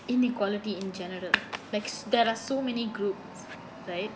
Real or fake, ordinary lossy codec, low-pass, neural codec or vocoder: real; none; none; none